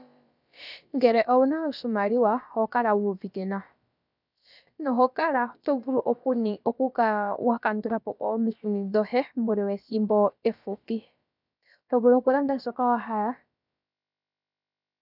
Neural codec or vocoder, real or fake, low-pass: codec, 16 kHz, about 1 kbps, DyCAST, with the encoder's durations; fake; 5.4 kHz